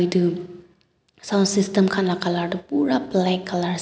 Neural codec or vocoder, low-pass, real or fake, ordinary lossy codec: none; none; real; none